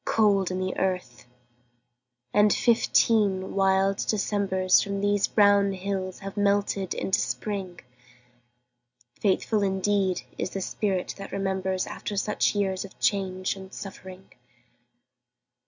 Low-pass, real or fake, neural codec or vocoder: 7.2 kHz; real; none